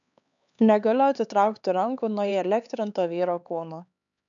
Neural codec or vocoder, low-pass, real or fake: codec, 16 kHz, 4 kbps, X-Codec, HuBERT features, trained on LibriSpeech; 7.2 kHz; fake